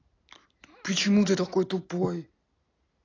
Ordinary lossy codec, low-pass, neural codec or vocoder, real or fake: AAC, 32 kbps; 7.2 kHz; none; real